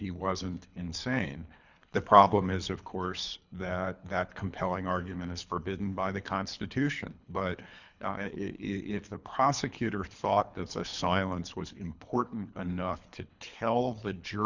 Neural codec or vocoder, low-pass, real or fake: codec, 24 kHz, 3 kbps, HILCodec; 7.2 kHz; fake